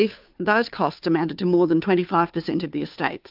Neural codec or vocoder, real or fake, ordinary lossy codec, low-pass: codec, 16 kHz, 2 kbps, FunCodec, trained on Chinese and English, 25 frames a second; fake; AAC, 48 kbps; 5.4 kHz